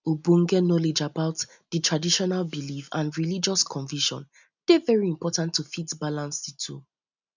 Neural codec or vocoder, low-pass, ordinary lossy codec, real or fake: none; 7.2 kHz; none; real